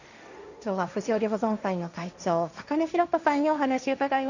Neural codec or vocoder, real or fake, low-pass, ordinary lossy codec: codec, 16 kHz, 1.1 kbps, Voila-Tokenizer; fake; 7.2 kHz; none